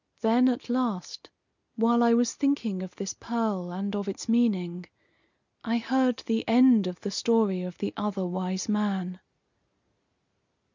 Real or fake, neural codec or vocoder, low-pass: real; none; 7.2 kHz